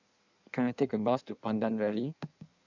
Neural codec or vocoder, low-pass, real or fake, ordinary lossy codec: codec, 16 kHz in and 24 kHz out, 1.1 kbps, FireRedTTS-2 codec; 7.2 kHz; fake; none